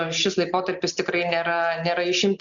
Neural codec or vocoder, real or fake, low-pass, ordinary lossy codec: none; real; 7.2 kHz; MP3, 96 kbps